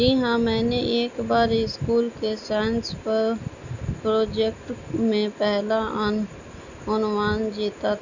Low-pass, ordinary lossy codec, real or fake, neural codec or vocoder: 7.2 kHz; none; real; none